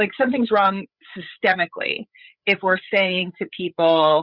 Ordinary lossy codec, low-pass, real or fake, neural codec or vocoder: Opus, 64 kbps; 5.4 kHz; fake; codec, 16 kHz, 8 kbps, FreqCodec, larger model